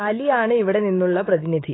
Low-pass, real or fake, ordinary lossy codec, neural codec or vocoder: 7.2 kHz; fake; AAC, 16 kbps; autoencoder, 48 kHz, 128 numbers a frame, DAC-VAE, trained on Japanese speech